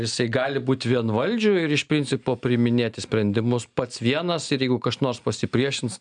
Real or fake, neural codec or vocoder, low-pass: real; none; 9.9 kHz